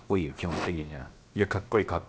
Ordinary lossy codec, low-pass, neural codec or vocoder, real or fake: none; none; codec, 16 kHz, about 1 kbps, DyCAST, with the encoder's durations; fake